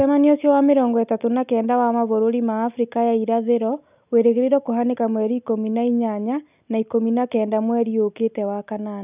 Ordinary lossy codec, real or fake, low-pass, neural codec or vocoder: none; real; 3.6 kHz; none